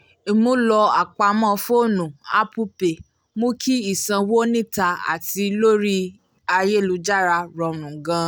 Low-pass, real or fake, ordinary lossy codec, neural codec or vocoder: none; real; none; none